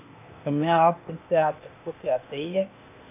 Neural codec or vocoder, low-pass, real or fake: codec, 16 kHz, 0.8 kbps, ZipCodec; 3.6 kHz; fake